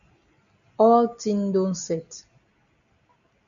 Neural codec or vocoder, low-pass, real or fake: none; 7.2 kHz; real